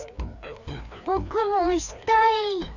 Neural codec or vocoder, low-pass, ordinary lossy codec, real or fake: codec, 16 kHz, 2 kbps, FreqCodec, larger model; 7.2 kHz; none; fake